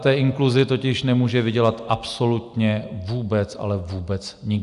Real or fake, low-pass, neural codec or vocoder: real; 10.8 kHz; none